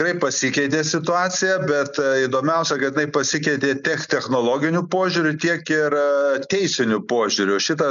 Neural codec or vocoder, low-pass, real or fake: none; 7.2 kHz; real